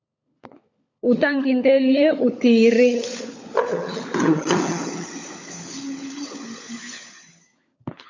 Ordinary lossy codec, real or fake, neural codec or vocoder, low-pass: AAC, 48 kbps; fake; codec, 16 kHz, 16 kbps, FunCodec, trained on LibriTTS, 50 frames a second; 7.2 kHz